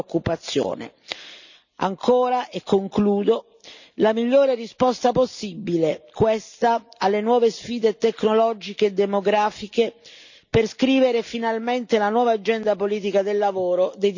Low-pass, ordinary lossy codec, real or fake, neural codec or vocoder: 7.2 kHz; none; real; none